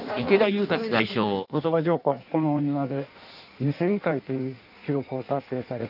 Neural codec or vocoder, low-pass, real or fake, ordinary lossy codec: codec, 16 kHz in and 24 kHz out, 1.1 kbps, FireRedTTS-2 codec; 5.4 kHz; fake; none